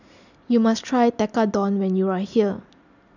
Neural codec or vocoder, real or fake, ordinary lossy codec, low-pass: none; real; none; 7.2 kHz